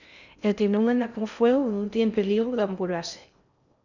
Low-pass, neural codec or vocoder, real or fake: 7.2 kHz; codec, 16 kHz in and 24 kHz out, 0.6 kbps, FocalCodec, streaming, 2048 codes; fake